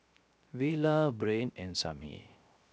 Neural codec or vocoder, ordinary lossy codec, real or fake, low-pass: codec, 16 kHz, 0.3 kbps, FocalCodec; none; fake; none